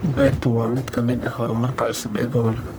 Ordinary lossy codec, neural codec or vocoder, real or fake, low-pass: none; codec, 44.1 kHz, 1.7 kbps, Pupu-Codec; fake; none